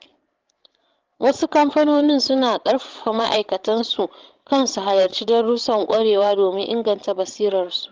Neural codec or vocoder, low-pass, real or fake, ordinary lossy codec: codec, 16 kHz, 16 kbps, FreqCodec, larger model; 7.2 kHz; fake; Opus, 24 kbps